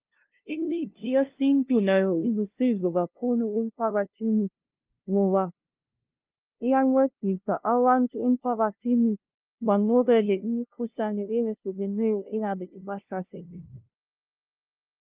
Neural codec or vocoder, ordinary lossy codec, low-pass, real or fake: codec, 16 kHz, 0.5 kbps, FunCodec, trained on LibriTTS, 25 frames a second; Opus, 24 kbps; 3.6 kHz; fake